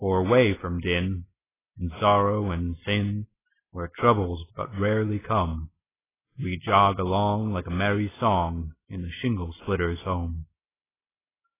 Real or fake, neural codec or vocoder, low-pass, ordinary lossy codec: real; none; 3.6 kHz; AAC, 16 kbps